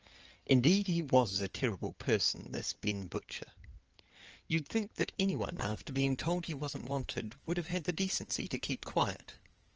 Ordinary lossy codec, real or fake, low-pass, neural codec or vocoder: Opus, 24 kbps; fake; 7.2 kHz; codec, 16 kHz in and 24 kHz out, 2.2 kbps, FireRedTTS-2 codec